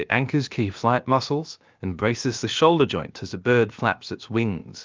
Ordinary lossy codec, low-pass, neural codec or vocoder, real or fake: Opus, 24 kbps; 7.2 kHz; codec, 16 kHz, about 1 kbps, DyCAST, with the encoder's durations; fake